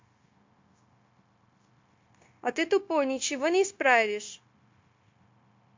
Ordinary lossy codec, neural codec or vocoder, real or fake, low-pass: MP3, 48 kbps; codec, 16 kHz, 0.9 kbps, LongCat-Audio-Codec; fake; 7.2 kHz